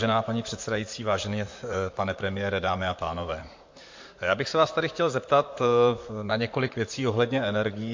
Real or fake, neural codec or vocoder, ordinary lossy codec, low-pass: fake; vocoder, 44.1 kHz, 128 mel bands, Pupu-Vocoder; MP3, 48 kbps; 7.2 kHz